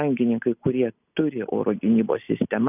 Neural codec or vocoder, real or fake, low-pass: none; real; 3.6 kHz